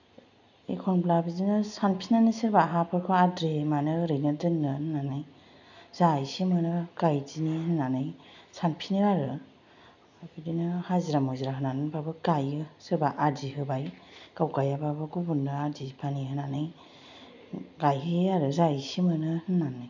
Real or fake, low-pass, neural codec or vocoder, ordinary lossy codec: real; 7.2 kHz; none; none